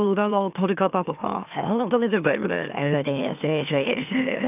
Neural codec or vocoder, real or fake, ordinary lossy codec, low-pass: autoencoder, 44.1 kHz, a latent of 192 numbers a frame, MeloTTS; fake; none; 3.6 kHz